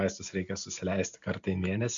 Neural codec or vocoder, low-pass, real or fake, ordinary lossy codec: none; 7.2 kHz; real; MP3, 64 kbps